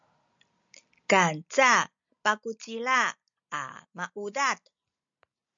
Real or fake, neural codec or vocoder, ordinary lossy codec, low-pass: real; none; MP3, 64 kbps; 7.2 kHz